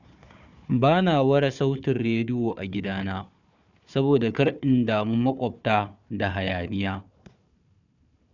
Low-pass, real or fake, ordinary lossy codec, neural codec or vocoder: 7.2 kHz; fake; none; codec, 16 kHz, 4 kbps, FunCodec, trained on Chinese and English, 50 frames a second